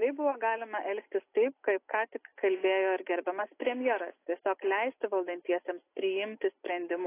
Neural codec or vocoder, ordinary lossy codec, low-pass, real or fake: none; AAC, 24 kbps; 3.6 kHz; real